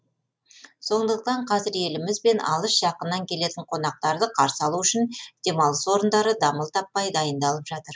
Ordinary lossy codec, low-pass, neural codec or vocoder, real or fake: none; none; none; real